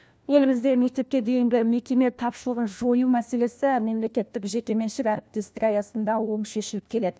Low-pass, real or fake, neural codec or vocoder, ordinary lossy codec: none; fake; codec, 16 kHz, 1 kbps, FunCodec, trained on LibriTTS, 50 frames a second; none